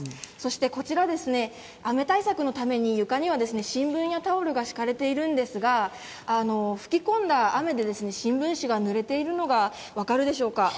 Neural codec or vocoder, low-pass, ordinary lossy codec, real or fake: none; none; none; real